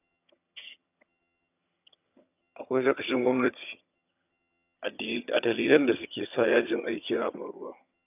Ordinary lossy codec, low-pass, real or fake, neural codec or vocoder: none; 3.6 kHz; fake; vocoder, 22.05 kHz, 80 mel bands, HiFi-GAN